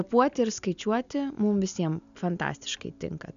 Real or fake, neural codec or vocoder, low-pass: real; none; 7.2 kHz